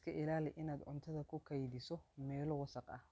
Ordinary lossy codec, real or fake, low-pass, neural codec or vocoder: none; real; none; none